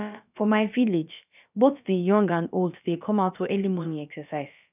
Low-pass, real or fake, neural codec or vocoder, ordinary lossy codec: 3.6 kHz; fake; codec, 16 kHz, about 1 kbps, DyCAST, with the encoder's durations; none